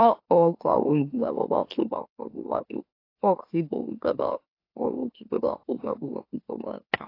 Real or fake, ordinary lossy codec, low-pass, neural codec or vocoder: fake; AAC, 32 kbps; 5.4 kHz; autoencoder, 44.1 kHz, a latent of 192 numbers a frame, MeloTTS